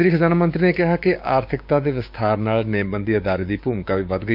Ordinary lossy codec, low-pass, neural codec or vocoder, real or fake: none; 5.4 kHz; codec, 16 kHz, 6 kbps, DAC; fake